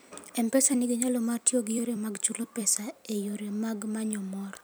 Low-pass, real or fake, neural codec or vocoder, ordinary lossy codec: none; fake; vocoder, 44.1 kHz, 128 mel bands every 256 samples, BigVGAN v2; none